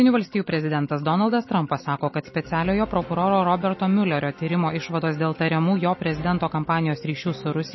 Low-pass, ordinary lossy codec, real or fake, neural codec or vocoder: 7.2 kHz; MP3, 24 kbps; real; none